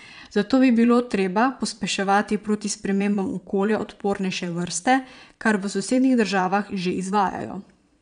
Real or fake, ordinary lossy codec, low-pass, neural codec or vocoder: fake; none; 9.9 kHz; vocoder, 22.05 kHz, 80 mel bands, Vocos